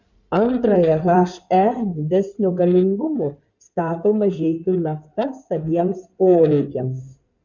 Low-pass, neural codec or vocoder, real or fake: 7.2 kHz; codec, 16 kHz in and 24 kHz out, 2.2 kbps, FireRedTTS-2 codec; fake